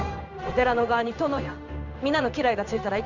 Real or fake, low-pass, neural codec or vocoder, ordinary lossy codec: fake; 7.2 kHz; codec, 16 kHz in and 24 kHz out, 1 kbps, XY-Tokenizer; none